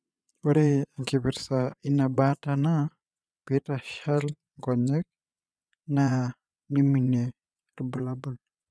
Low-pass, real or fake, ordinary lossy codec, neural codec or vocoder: none; fake; none; vocoder, 22.05 kHz, 80 mel bands, Vocos